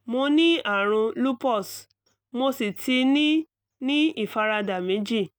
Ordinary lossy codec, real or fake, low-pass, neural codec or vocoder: none; real; none; none